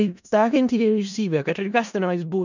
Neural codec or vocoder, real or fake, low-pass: codec, 16 kHz in and 24 kHz out, 0.4 kbps, LongCat-Audio-Codec, four codebook decoder; fake; 7.2 kHz